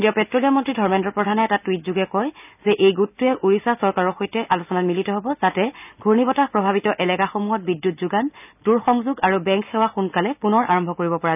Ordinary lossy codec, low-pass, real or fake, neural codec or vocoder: none; 3.6 kHz; real; none